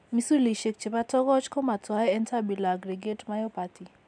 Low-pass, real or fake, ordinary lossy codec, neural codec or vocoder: 9.9 kHz; real; none; none